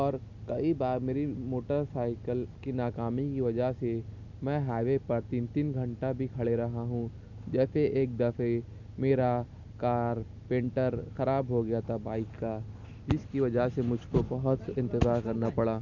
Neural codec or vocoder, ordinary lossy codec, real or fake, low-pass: none; none; real; 7.2 kHz